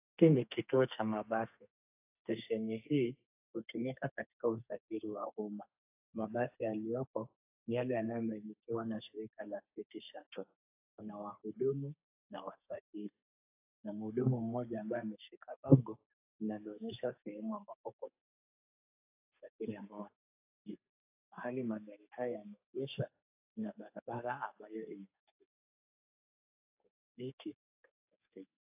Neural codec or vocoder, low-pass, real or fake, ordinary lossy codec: codec, 32 kHz, 1.9 kbps, SNAC; 3.6 kHz; fake; AAC, 32 kbps